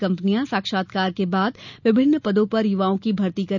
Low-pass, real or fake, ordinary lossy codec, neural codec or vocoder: 7.2 kHz; real; none; none